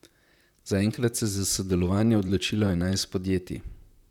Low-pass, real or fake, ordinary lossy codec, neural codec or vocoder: 19.8 kHz; fake; none; vocoder, 44.1 kHz, 128 mel bands, Pupu-Vocoder